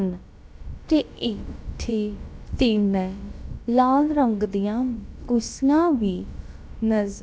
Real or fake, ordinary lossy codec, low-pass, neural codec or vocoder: fake; none; none; codec, 16 kHz, about 1 kbps, DyCAST, with the encoder's durations